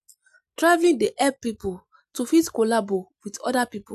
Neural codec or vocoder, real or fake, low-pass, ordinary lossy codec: none; real; 14.4 kHz; MP3, 96 kbps